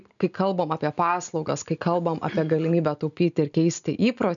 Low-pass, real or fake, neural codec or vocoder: 7.2 kHz; real; none